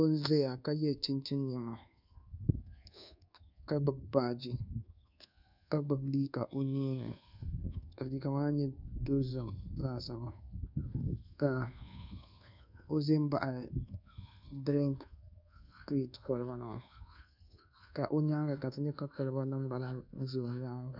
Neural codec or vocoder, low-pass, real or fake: codec, 24 kHz, 1.2 kbps, DualCodec; 5.4 kHz; fake